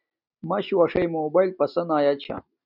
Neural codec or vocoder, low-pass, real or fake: none; 5.4 kHz; real